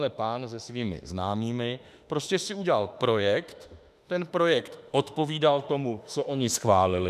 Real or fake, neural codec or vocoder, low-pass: fake; autoencoder, 48 kHz, 32 numbers a frame, DAC-VAE, trained on Japanese speech; 14.4 kHz